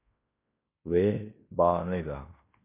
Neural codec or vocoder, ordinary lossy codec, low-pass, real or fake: codec, 16 kHz in and 24 kHz out, 0.9 kbps, LongCat-Audio-Codec, fine tuned four codebook decoder; MP3, 24 kbps; 3.6 kHz; fake